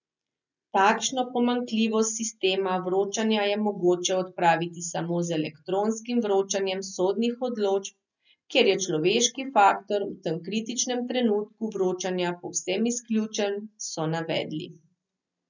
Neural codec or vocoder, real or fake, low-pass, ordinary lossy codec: none; real; 7.2 kHz; none